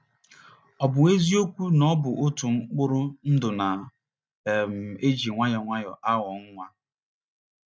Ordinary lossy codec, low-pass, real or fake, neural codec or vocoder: none; none; real; none